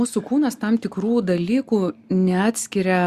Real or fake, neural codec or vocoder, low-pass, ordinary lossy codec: real; none; 14.4 kHz; Opus, 64 kbps